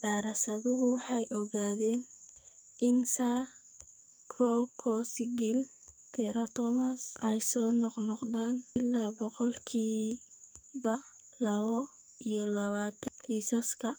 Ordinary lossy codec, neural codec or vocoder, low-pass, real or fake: none; codec, 44.1 kHz, 2.6 kbps, SNAC; none; fake